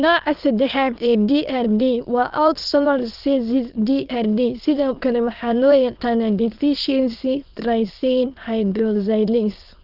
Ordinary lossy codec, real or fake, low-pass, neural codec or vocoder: Opus, 32 kbps; fake; 5.4 kHz; autoencoder, 22.05 kHz, a latent of 192 numbers a frame, VITS, trained on many speakers